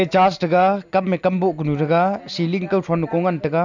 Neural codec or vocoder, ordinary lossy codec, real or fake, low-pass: none; none; real; 7.2 kHz